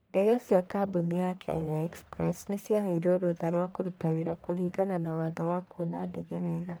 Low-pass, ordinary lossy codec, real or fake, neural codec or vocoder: none; none; fake; codec, 44.1 kHz, 1.7 kbps, Pupu-Codec